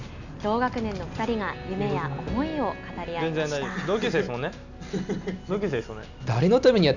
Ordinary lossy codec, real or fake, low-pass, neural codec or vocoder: none; real; 7.2 kHz; none